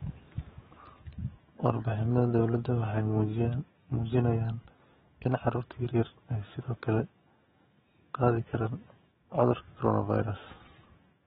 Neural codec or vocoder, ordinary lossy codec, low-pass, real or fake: autoencoder, 48 kHz, 128 numbers a frame, DAC-VAE, trained on Japanese speech; AAC, 16 kbps; 19.8 kHz; fake